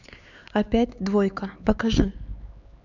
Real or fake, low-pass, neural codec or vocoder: fake; 7.2 kHz; codec, 16 kHz, 4 kbps, X-Codec, HuBERT features, trained on LibriSpeech